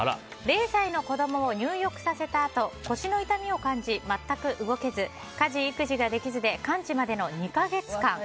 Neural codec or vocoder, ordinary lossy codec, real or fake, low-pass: none; none; real; none